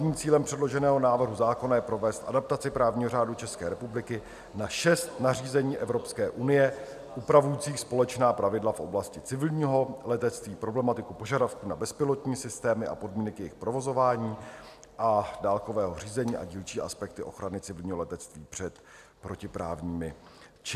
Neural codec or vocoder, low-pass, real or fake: none; 14.4 kHz; real